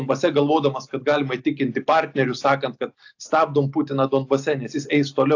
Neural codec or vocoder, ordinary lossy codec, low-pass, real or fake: none; AAC, 48 kbps; 7.2 kHz; real